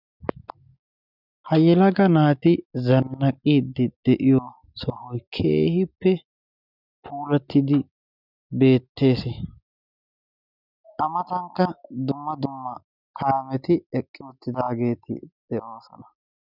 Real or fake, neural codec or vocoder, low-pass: real; none; 5.4 kHz